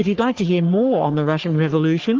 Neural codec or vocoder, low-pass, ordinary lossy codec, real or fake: codec, 44.1 kHz, 3.4 kbps, Pupu-Codec; 7.2 kHz; Opus, 16 kbps; fake